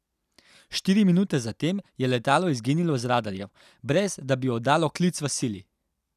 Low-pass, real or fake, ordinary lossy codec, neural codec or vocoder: 14.4 kHz; real; none; none